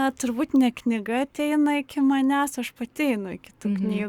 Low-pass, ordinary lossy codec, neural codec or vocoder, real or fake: 19.8 kHz; Opus, 64 kbps; codec, 44.1 kHz, 7.8 kbps, Pupu-Codec; fake